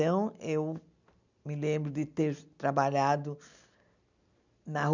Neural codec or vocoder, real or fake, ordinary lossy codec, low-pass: none; real; none; 7.2 kHz